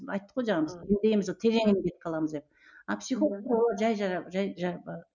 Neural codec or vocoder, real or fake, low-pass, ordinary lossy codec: none; real; 7.2 kHz; none